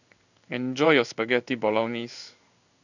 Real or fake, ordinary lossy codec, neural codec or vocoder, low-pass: fake; none; codec, 16 kHz in and 24 kHz out, 1 kbps, XY-Tokenizer; 7.2 kHz